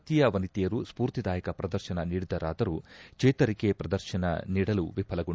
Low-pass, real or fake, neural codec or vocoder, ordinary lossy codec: none; real; none; none